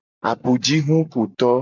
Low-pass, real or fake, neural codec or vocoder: 7.2 kHz; real; none